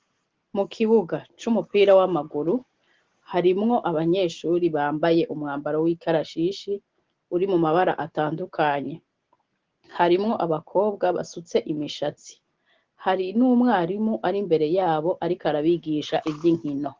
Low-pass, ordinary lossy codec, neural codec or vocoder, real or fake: 7.2 kHz; Opus, 16 kbps; none; real